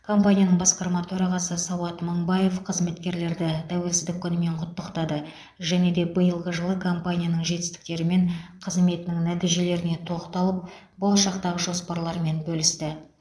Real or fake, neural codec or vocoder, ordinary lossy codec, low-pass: fake; vocoder, 22.05 kHz, 80 mel bands, Vocos; none; none